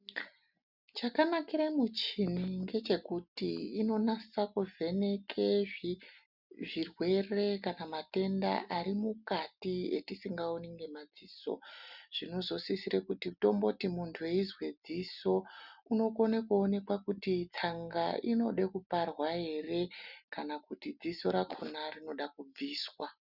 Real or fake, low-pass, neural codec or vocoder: real; 5.4 kHz; none